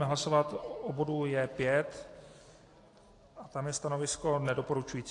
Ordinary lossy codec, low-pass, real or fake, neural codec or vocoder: AAC, 48 kbps; 10.8 kHz; real; none